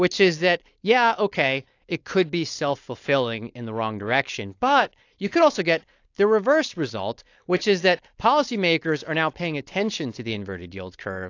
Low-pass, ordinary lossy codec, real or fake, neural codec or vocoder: 7.2 kHz; AAC, 48 kbps; fake; codec, 16 kHz, 4.8 kbps, FACodec